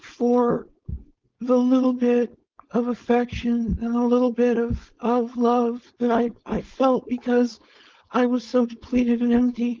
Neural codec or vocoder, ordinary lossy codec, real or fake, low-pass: codec, 16 kHz, 4.8 kbps, FACodec; Opus, 32 kbps; fake; 7.2 kHz